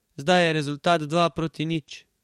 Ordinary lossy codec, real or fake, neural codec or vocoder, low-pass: MP3, 64 kbps; fake; codec, 44.1 kHz, 7.8 kbps, DAC; 19.8 kHz